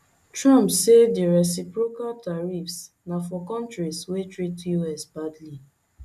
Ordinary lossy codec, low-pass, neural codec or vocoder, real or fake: MP3, 96 kbps; 14.4 kHz; none; real